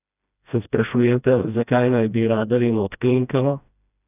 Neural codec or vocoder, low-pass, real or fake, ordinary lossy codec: codec, 16 kHz, 1 kbps, FreqCodec, smaller model; 3.6 kHz; fake; none